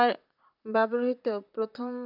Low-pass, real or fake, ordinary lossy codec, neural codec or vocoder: 5.4 kHz; fake; none; vocoder, 44.1 kHz, 128 mel bands, Pupu-Vocoder